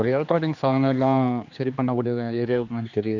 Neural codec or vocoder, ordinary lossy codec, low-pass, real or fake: codec, 16 kHz, 2 kbps, X-Codec, HuBERT features, trained on general audio; none; 7.2 kHz; fake